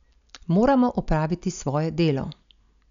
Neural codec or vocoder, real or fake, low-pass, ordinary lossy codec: none; real; 7.2 kHz; none